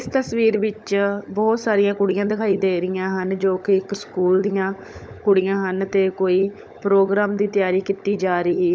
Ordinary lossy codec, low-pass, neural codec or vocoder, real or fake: none; none; codec, 16 kHz, 16 kbps, FunCodec, trained on LibriTTS, 50 frames a second; fake